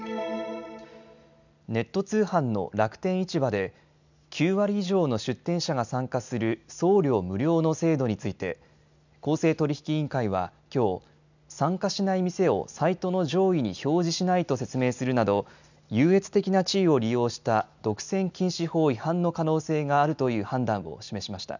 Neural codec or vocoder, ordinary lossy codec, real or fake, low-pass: none; none; real; 7.2 kHz